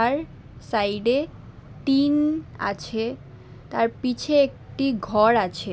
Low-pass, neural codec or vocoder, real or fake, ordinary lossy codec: none; none; real; none